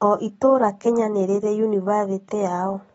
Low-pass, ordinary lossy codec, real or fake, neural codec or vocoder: 9.9 kHz; AAC, 24 kbps; real; none